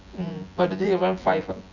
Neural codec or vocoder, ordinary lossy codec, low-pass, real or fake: vocoder, 24 kHz, 100 mel bands, Vocos; none; 7.2 kHz; fake